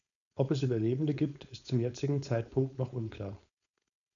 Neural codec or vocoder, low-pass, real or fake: codec, 16 kHz, 4.8 kbps, FACodec; 7.2 kHz; fake